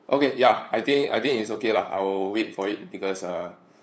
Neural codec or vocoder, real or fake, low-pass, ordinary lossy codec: codec, 16 kHz, 8 kbps, FunCodec, trained on LibriTTS, 25 frames a second; fake; none; none